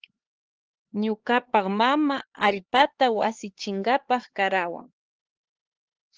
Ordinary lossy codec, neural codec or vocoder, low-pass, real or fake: Opus, 16 kbps; codec, 16 kHz, 4 kbps, X-Codec, HuBERT features, trained on LibriSpeech; 7.2 kHz; fake